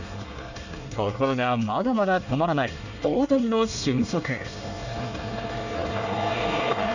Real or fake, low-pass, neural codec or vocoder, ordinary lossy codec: fake; 7.2 kHz; codec, 24 kHz, 1 kbps, SNAC; none